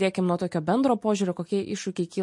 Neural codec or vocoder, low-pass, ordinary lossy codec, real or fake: none; 10.8 kHz; MP3, 48 kbps; real